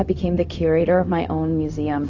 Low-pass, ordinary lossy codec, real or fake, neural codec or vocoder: 7.2 kHz; AAC, 48 kbps; fake; codec, 16 kHz, 0.4 kbps, LongCat-Audio-Codec